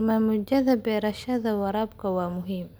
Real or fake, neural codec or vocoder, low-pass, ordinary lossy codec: real; none; none; none